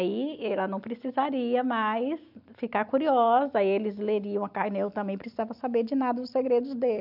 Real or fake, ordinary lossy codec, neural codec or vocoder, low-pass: real; none; none; 5.4 kHz